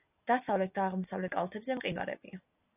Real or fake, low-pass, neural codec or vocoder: real; 3.6 kHz; none